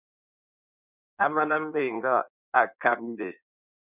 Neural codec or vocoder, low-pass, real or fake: codec, 16 kHz in and 24 kHz out, 1.1 kbps, FireRedTTS-2 codec; 3.6 kHz; fake